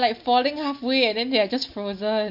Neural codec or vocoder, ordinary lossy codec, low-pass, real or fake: none; MP3, 48 kbps; 5.4 kHz; real